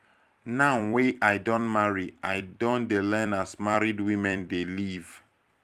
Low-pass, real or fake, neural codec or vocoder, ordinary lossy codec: 14.4 kHz; fake; vocoder, 44.1 kHz, 128 mel bands every 256 samples, BigVGAN v2; Opus, 32 kbps